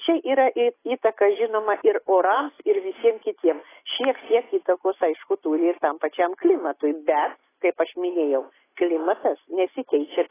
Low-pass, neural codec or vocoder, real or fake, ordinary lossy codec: 3.6 kHz; none; real; AAC, 16 kbps